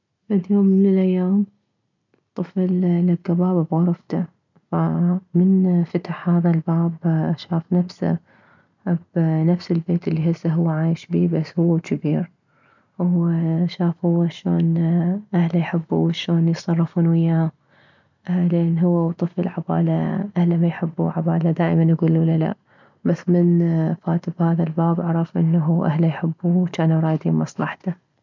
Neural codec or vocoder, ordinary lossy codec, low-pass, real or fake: none; none; 7.2 kHz; real